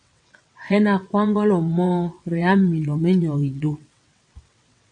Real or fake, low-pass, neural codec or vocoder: fake; 9.9 kHz; vocoder, 22.05 kHz, 80 mel bands, WaveNeXt